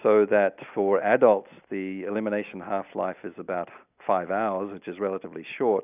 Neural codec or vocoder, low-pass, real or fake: none; 3.6 kHz; real